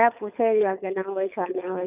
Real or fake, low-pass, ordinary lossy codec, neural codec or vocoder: fake; 3.6 kHz; none; codec, 24 kHz, 6 kbps, HILCodec